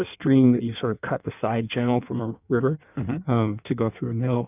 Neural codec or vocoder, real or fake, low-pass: codec, 16 kHz in and 24 kHz out, 1.1 kbps, FireRedTTS-2 codec; fake; 3.6 kHz